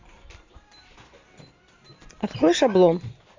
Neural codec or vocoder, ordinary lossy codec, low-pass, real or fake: none; MP3, 64 kbps; 7.2 kHz; real